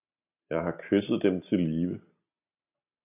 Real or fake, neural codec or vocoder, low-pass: real; none; 3.6 kHz